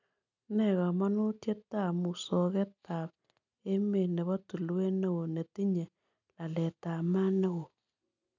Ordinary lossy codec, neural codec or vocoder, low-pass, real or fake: none; none; 7.2 kHz; real